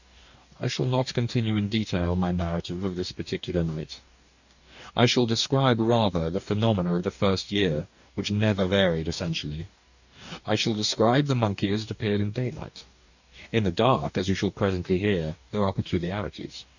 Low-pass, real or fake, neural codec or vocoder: 7.2 kHz; fake; codec, 44.1 kHz, 2.6 kbps, DAC